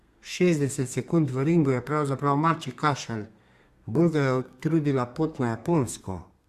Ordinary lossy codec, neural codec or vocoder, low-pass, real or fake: Opus, 64 kbps; codec, 32 kHz, 1.9 kbps, SNAC; 14.4 kHz; fake